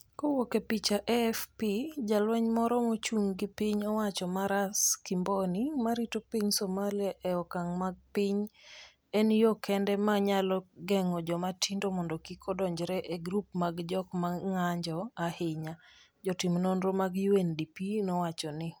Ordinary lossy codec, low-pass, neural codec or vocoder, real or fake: none; none; none; real